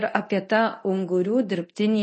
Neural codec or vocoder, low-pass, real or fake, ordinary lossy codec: codec, 24 kHz, 0.9 kbps, DualCodec; 10.8 kHz; fake; MP3, 32 kbps